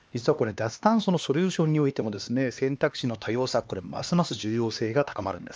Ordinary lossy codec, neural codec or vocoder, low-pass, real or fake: none; codec, 16 kHz, 2 kbps, X-Codec, HuBERT features, trained on LibriSpeech; none; fake